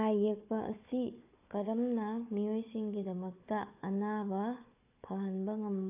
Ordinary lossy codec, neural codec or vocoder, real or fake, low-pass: AAC, 24 kbps; codec, 16 kHz, 4 kbps, FunCodec, trained on Chinese and English, 50 frames a second; fake; 3.6 kHz